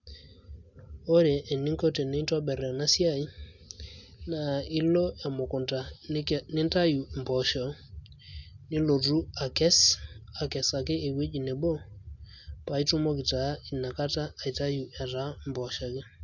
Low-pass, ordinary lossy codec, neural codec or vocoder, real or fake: 7.2 kHz; none; none; real